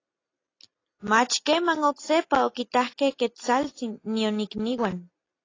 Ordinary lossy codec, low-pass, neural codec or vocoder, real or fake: AAC, 32 kbps; 7.2 kHz; none; real